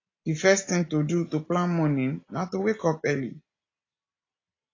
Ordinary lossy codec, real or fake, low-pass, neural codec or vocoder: AAC, 32 kbps; real; 7.2 kHz; none